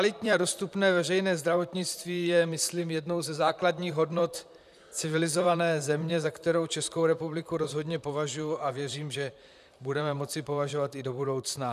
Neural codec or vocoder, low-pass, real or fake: vocoder, 44.1 kHz, 128 mel bands, Pupu-Vocoder; 14.4 kHz; fake